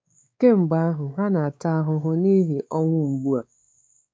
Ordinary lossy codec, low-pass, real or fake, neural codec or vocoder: none; none; fake; codec, 16 kHz, 4 kbps, X-Codec, WavLM features, trained on Multilingual LibriSpeech